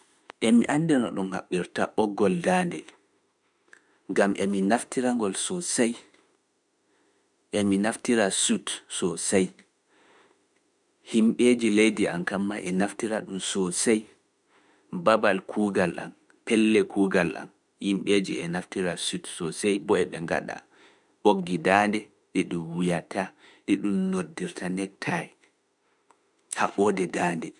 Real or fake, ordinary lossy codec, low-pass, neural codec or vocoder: fake; none; 10.8 kHz; autoencoder, 48 kHz, 32 numbers a frame, DAC-VAE, trained on Japanese speech